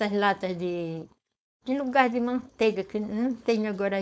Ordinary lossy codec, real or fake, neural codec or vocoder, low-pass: none; fake; codec, 16 kHz, 4.8 kbps, FACodec; none